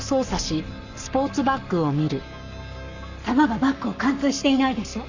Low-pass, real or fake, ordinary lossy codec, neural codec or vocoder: 7.2 kHz; fake; none; codec, 44.1 kHz, 7.8 kbps, Pupu-Codec